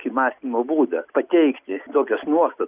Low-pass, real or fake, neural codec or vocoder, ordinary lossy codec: 3.6 kHz; real; none; Opus, 64 kbps